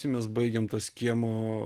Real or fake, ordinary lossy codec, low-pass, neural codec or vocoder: real; Opus, 24 kbps; 14.4 kHz; none